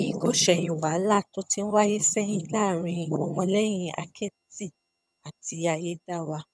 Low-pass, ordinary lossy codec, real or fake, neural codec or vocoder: none; none; fake; vocoder, 22.05 kHz, 80 mel bands, HiFi-GAN